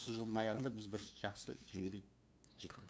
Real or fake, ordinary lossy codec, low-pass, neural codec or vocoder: fake; none; none; codec, 16 kHz, 2 kbps, FunCodec, trained on LibriTTS, 25 frames a second